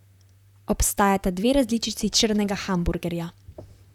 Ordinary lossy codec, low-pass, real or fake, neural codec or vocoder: none; 19.8 kHz; fake; vocoder, 44.1 kHz, 128 mel bands, Pupu-Vocoder